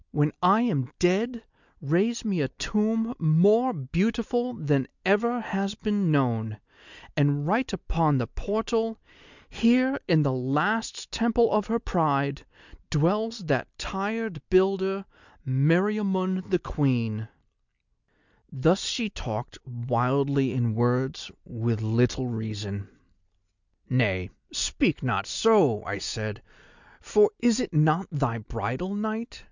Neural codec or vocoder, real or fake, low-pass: none; real; 7.2 kHz